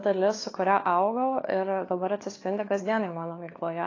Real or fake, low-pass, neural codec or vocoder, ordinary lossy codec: fake; 7.2 kHz; codec, 16 kHz, 4 kbps, FunCodec, trained on LibriTTS, 50 frames a second; AAC, 32 kbps